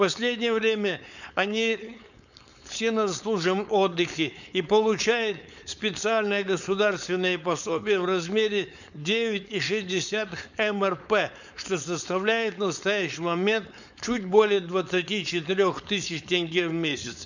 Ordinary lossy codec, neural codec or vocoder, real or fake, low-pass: none; codec, 16 kHz, 4.8 kbps, FACodec; fake; 7.2 kHz